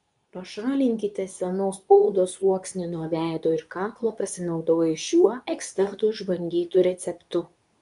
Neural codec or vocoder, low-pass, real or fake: codec, 24 kHz, 0.9 kbps, WavTokenizer, medium speech release version 2; 10.8 kHz; fake